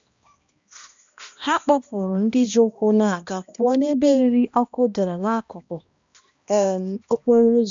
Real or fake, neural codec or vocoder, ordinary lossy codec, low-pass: fake; codec, 16 kHz, 1 kbps, X-Codec, HuBERT features, trained on balanced general audio; MP3, 64 kbps; 7.2 kHz